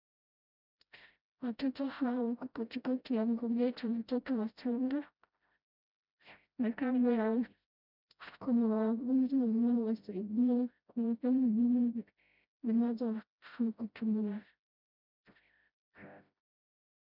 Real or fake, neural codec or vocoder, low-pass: fake; codec, 16 kHz, 0.5 kbps, FreqCodec, smaller model; 5.4 kHz